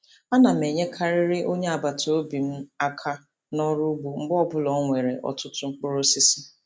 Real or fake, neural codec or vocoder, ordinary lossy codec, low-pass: real; none; none; none